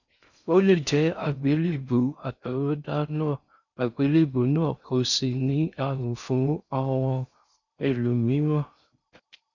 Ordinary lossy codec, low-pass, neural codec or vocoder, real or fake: none; 7.2 kHz; codec, 16 kHz in and 24 kHz out, 0.6 kbps, FocalCodec, streaming, 4096 codes; fake